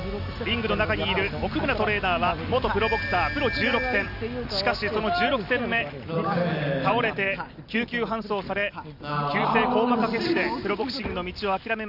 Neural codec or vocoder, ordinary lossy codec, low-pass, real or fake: none; none; 5.4 kHz; real